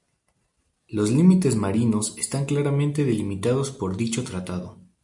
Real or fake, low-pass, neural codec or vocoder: real; 10.8 kHz; none